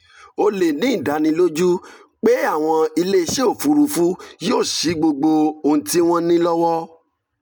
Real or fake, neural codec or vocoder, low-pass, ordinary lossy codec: real; none; none; none